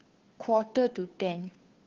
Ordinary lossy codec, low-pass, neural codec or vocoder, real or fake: Opus, 16 kbps; 7.2 kHz; codec, 16 kHz, 2 kbps, FunCodec, trained on Chinese and English, 25 frames a second; fake